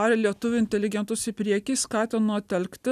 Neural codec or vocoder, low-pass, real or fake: none; 14.4 kHz; real